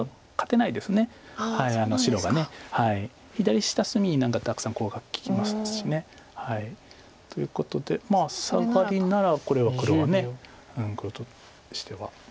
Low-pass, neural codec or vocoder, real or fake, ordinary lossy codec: none; none; real; none